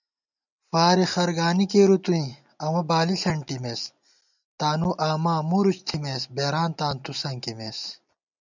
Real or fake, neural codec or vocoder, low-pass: real; none; 7.2 kHz